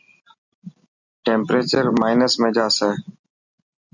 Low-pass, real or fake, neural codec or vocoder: 7.2 kHz; real; none